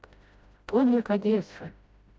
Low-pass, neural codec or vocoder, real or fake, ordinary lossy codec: none; codec, 16 kHz, 0.5 kbps, FreqCodec, smaller model; fake; none